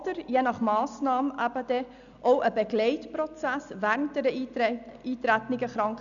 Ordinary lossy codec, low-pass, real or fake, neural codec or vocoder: none; 7.2 kHz; real; none